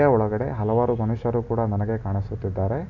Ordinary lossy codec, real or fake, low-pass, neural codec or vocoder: none; real; 7.2 kHz; none